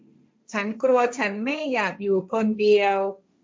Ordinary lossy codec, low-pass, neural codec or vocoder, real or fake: none; none; codec, 16 kHz, 1.1 kbps, Voila-Tokenizer; fake